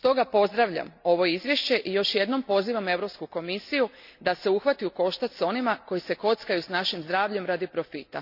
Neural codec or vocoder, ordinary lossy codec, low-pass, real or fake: none; none; 5.4 kHz; real